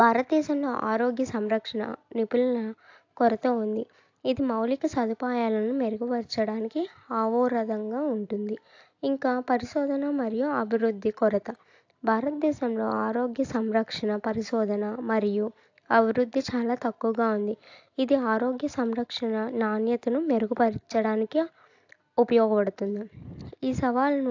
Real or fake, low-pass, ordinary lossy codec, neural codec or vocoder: real; 7.2 kHz; AAC, 48 kbps; none